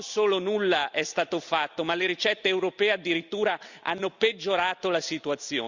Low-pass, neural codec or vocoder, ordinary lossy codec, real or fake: 7.2 kHz; none; Opus, 64 kbps; real